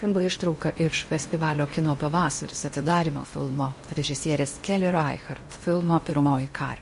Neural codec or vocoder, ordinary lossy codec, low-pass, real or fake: codec, 16 kHz in and 24 kHz out, 0.8 kbps, FocalCodec, streaming, 65536 codes; MP3, 48 kbps; 10.8 kHz; fake